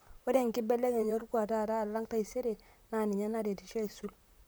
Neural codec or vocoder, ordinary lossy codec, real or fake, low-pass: vocoder, 44.1 kHz, 128 mel bands, Pupu-Vocoder; none; fake; none